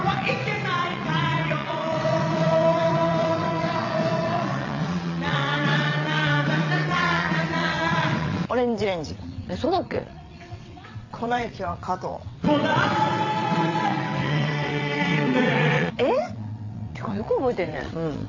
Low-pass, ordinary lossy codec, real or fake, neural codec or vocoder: 7.2 kHz; AAC, 48 kbps; fake; vocoder, 22.05 kHz, 80 mel bands, WaveNeXt